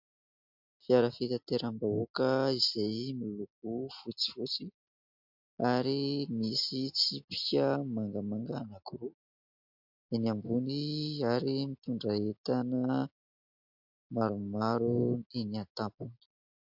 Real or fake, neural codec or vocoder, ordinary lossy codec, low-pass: real; none; MP3, 48 kbps; 5.4 kHz